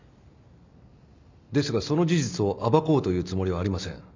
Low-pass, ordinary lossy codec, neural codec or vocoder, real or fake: 7.2 kHz; MP3, 64 kbps; none; real